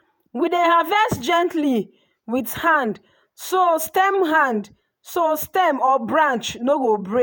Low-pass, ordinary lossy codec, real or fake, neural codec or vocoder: none; none; fake; vocoder, 48 kHz, 128 mel bands, Vocos